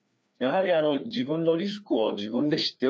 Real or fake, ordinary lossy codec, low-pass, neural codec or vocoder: fake; none; none; codec, 16 kHz, 2 kbps, FreqCodec, larger model